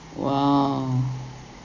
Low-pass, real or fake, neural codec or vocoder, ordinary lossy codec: 7.2 kHz; real; none; none